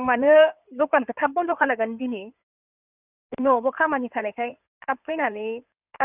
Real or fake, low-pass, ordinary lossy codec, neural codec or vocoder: fake; 3.6 kHz; none; codec, 16 kHz in and 24 kHz out, 2.2 kbps, FireRedTTS-2 codec